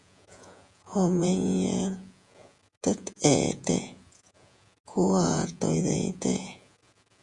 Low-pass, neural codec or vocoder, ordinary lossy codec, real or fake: 10.8 kHz; vocoder, 48 kHz, 128 mel bands, Vocos; Opus, 64 kbps; fake